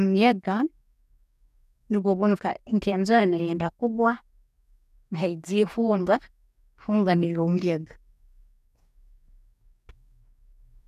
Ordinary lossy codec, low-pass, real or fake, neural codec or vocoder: none; 14.4 kHz; fake; codec, 44.1 kHz, 2.6 kbps, DAC